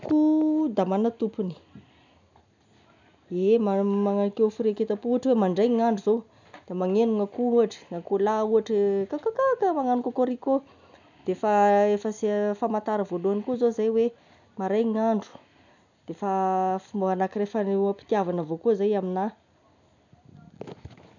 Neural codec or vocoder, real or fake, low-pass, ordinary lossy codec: none; real; 7.2 kHz; none